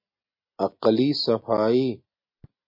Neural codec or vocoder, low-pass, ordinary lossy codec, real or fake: none; 5.4 kHz; MP3, 32 kbps; real